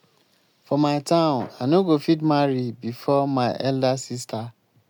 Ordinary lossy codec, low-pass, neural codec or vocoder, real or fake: MP3, 96 kbps; 19.8 kHz; none; real